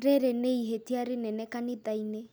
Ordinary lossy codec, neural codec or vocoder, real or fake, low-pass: none; none; real; none